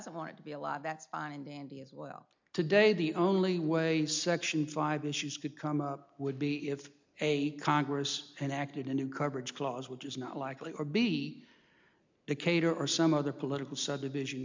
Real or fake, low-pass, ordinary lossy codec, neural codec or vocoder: real; 7.2 kHz; MP3, 64 kbps; none